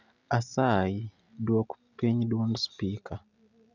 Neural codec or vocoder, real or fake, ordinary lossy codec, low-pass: none; real; none; 7.2 kHz